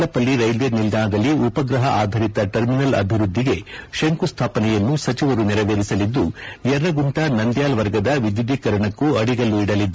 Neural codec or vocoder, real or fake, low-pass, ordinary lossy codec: none; real; none; none